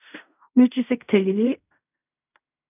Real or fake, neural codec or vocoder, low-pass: fake; codec, 16 kHz in and 24 kHz out, 0.4 kbps, LongCat-Audio-Codec, fine tuned four codebook decoder; 3.6 kHz